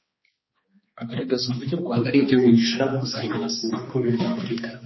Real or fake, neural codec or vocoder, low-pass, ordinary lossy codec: fake; codec, 16 kHz, 2 kbps, X-Codec, HuBERT features, trained on balanced general audio; 7.2 kHz; MP3, 24 kbps